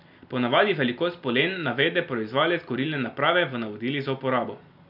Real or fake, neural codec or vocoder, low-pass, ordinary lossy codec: real; none; 5.4 kHz; none